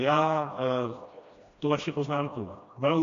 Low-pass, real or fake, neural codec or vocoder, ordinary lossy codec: 7.2 kHz; fake; codec, 16 kHz, 1 kbps, FreqCodec, smaller model; MP3, 64 kbps